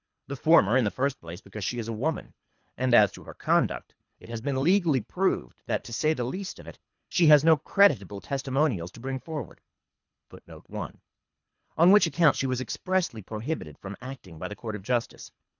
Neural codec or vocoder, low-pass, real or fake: codec, 24 kHz, 3 kbps, HILCodec; 7.2 kHz; fake